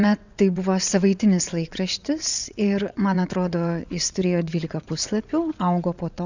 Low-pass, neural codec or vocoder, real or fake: 7.2 kHz; vocoder, 22.05 kHz, 80 mel bands, WaveNeXt; fake